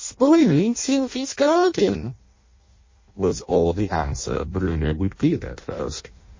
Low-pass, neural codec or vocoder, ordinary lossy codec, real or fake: 7.2 kHz; codec, 16 kHz in and 24 kHz out, 0.6 kbps, FireRedTTS-2 codec; MP3, 32 kbps; fake